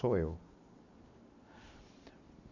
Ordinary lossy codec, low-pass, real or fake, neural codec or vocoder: none; 7.2 kHz; real; none